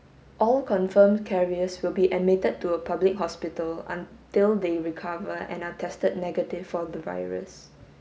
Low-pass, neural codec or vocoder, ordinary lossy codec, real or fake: none; none; none; real